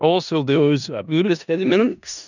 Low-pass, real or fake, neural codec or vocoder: 7.2 kHz; fake; codec, 16 kHz in and 24 kHz out, 0.4 kbps, LongCat-Audio-Codec, four codebook decoder